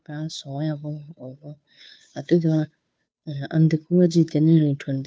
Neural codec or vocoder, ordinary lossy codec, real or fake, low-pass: codec, 16 kHz, 2 kbps, FunCodec, trained on Chinese and English, 25 frames a second; none; fake; none